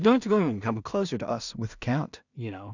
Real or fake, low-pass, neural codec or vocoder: fake; 7.2 kHz; codec, 16 kHz in and 24 kHz out, 0.4 kbps, LongCat-Audio-Codec, two codebook decoder